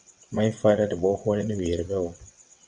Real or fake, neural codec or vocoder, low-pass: fake; vocoder, 22.05 kHz, 80 mel bands, WaveNeXt; 9.9 kHz